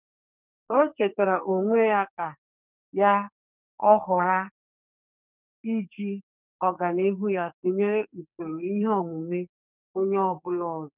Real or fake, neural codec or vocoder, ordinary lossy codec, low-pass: fake; codec, 44.1 kHz, 2.6 kbps, SNAC; none; 3.6 kHz